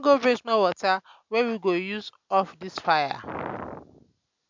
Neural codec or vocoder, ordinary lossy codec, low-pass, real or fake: none; MP3, 64 kbps; 7.2 kHz; real